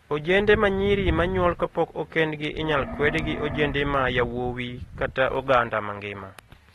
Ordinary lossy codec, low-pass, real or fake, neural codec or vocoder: AAC, 32 kbps; 19.8 kHz; real; none